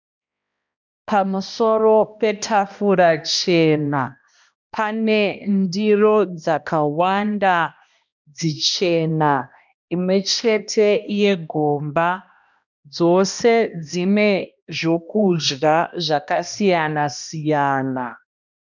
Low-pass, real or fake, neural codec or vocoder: 7.2 kHz; fake; codec, 16 kHz, 1 kbps, X-Codec, HuBERT features, trained on balanced general audio